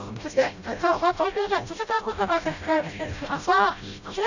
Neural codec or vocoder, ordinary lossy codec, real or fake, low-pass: codec, 16 kHz, 0.5 kbps, FreqCodec, smaller model; none; fake; 7.2 kHz